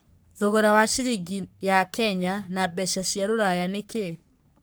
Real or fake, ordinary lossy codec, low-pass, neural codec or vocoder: fake; none; none; codec, 44.1 kHz, 3.4 kbps, Pupu-Codec